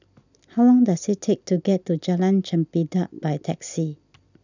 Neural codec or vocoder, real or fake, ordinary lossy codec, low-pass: none; real; none; 7.2 kHz